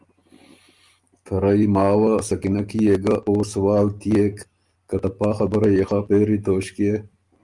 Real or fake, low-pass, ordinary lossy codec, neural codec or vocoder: real; 10.8 kHz; Opus, 32 kbps; none